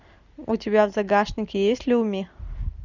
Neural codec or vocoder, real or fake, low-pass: none; real; 7.2 kHz